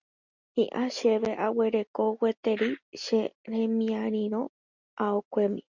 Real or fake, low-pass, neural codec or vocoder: real; 7.2 kHz; none